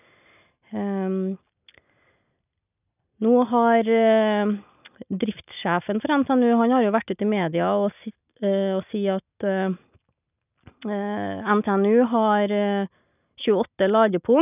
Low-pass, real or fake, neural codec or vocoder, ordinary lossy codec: 3.6 kHz; real; none; none